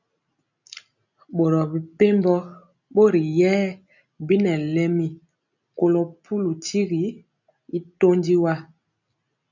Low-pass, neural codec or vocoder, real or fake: 7.2 kHz; none; real